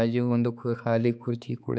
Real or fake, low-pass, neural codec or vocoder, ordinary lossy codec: fake; none; codec, 16 kHz, 4 kbps, X-Codec, HuBERT features, trained on balanced general audio; none